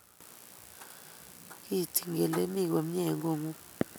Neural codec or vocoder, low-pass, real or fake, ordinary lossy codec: none; none; real; none